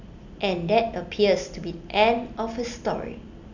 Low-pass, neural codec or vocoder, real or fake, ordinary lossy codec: 7.2 kHz; none; real; none